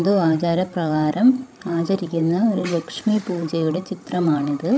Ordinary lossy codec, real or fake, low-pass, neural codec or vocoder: none; fake; none; codec, 16 kHz, 16 kbps, FreqCodec, larger model